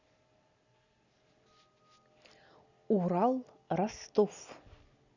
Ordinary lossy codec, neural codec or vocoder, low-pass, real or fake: none; none; 7.2 kHz; real